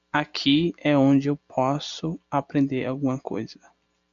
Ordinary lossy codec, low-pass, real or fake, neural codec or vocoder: MP3, 64 kbps; 7.2 kHz; real; none